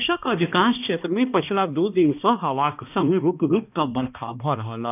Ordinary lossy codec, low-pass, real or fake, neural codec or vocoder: none; 3.6 kHz; fake; codec, 16 kHz, 1 kbps, X-Codec, HuBERT features, trained on balanced general audio